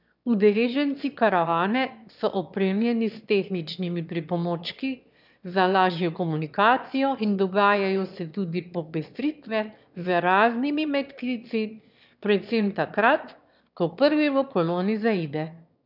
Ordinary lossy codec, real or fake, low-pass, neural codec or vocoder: none; fake; 5.4 kHz; autoencoder, 22.05 kHz, a latent of 192 numbers a frame, VITS, trained on one speaker